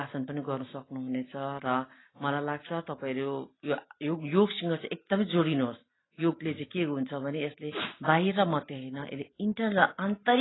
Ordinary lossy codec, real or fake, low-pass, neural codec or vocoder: AAC, 16 kbps; real; 7.2 kHz; none